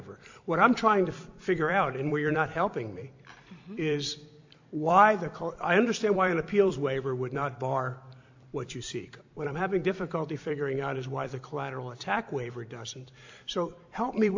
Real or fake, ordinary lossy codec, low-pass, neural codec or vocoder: fake; AAC, 48 kbps; 7.2 kHz; vocoder, 44.1 kHz, 128 mel bands every 256 samples, BigVGAN v2